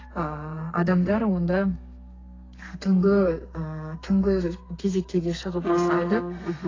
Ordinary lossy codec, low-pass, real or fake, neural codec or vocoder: AAC, 32 kbps; 7.2 kHz; fake; codec, 32 kHz, 1.9 kbps, SNAC